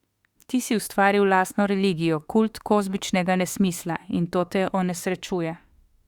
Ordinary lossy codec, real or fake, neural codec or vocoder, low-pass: Opus, 64 kbps; fake; autoencoder, 48 kHz, 32 numbers a frame, DAC-VAE, trained on Japanese speech; 19.8 kHz